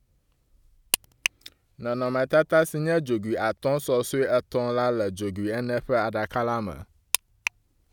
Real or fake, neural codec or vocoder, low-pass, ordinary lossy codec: real; none; 19.8 kHz; none